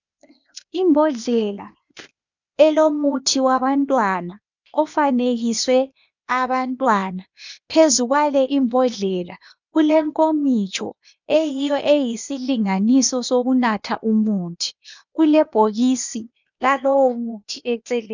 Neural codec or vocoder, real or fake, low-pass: codec, 16 kHz, 0.8 kbps, ZipCodec; fake; 7.2 kHz